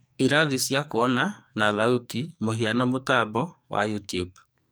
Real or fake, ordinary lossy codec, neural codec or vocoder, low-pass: fake; none; codec, 44.1 kHz, 2.6 kbps, SNAC; none